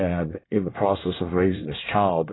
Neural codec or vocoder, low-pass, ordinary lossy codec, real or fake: codec, 24 kHz, 1 kbps, SNAC; 7.2 kHz; AAC, 16 kbps; fake